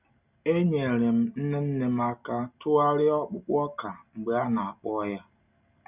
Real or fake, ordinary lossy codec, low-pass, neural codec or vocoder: real; none; 3.6 kHz; none